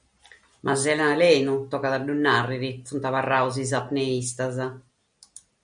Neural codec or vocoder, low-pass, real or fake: none; 9.9 kHz; real